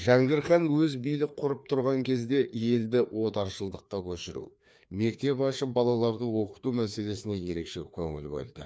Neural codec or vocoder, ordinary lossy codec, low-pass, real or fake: codec, 16 kHz, 2 kbps, FreqCodec, larger model; none; none; fake